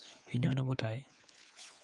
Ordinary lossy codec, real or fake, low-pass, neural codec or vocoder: Opus, 32 kbps; fake; 10.8 kHz; codec, 24 kHz, 3.1 kbps, DualCodec